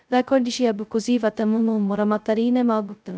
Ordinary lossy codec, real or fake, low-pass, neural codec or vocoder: none; fake; none; codec, 16 kHz, 0.2 kbps, FocalCodec